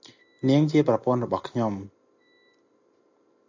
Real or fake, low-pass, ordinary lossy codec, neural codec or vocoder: real; 7.2 kHz; AAC, 48 kbps; none